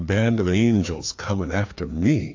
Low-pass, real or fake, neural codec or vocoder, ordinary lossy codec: 7.2 kHz; fake; codec, 16 kHz, 2 kbps, FreqCodec, larger model; AAC, 48 kbps